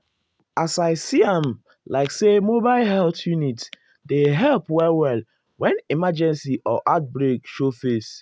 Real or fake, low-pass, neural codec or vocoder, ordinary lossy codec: real; none; none; none